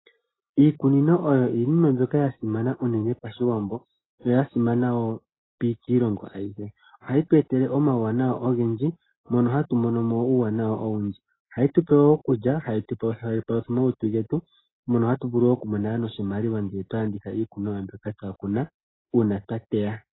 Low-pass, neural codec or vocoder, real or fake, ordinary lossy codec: 7.2 kHz; none; real; AAC, 16 kbps